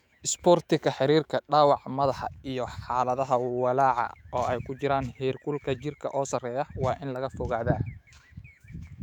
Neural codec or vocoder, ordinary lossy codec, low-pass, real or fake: autoencoder, 48 kHz, 128 numbers a frame, DAC-VAE, trained on Japanese speech; none; 19.8 kHz; fake